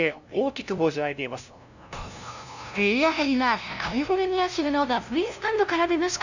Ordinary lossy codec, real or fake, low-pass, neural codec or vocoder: none; fake; 7.2 kHz; codec, 16 kHz, 0.5 kbps, FunCodec, trained on LibriTTS, 25 frames a second